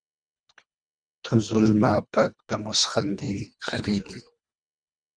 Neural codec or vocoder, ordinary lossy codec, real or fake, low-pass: codec, 24 kHz, 1.5 kbps, HILCodec; MP3, 96 kbps; fake; 9.9 kHz